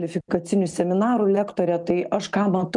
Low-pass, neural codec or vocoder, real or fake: 10.8 kHz; none; real